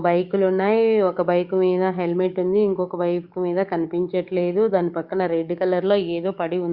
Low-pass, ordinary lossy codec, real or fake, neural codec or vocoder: 5.4 kHz; Opus, 64 kbps; fake; codec, 44.1 kHz, 7.8 kbps, DAC